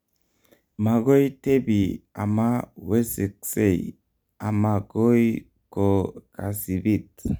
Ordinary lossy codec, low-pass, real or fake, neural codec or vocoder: none; none; real; none